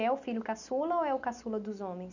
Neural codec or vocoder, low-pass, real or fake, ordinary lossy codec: none; 7.2 kHz; real; none